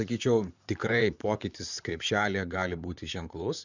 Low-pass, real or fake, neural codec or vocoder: 7.2 kHz; fake; vocoder, 44.1 kHz, 128 mel bands, Pupu-Vocoder